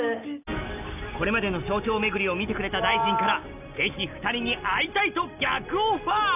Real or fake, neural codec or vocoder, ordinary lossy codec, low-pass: real; none; none; 3.6 kHz